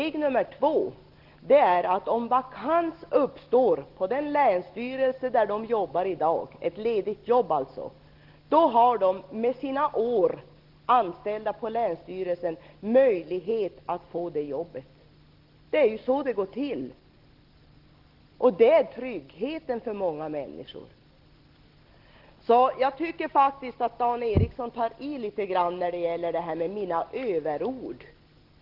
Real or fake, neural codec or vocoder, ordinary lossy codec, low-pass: real; none; Opus, 16 kbps; 5.4 kHz